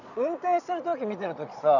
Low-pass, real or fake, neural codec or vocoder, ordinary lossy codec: 7.2 kHz; fake; vocoder, 22.05 kHz, 80 mel bands, Vocos; none